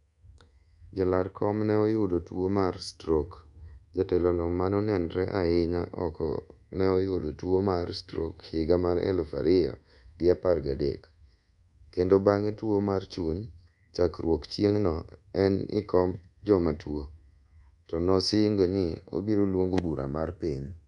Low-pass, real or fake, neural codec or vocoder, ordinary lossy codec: 10.8 kHz; fake; codec, 24 kHz, 1.2 kbps, DualCodec; none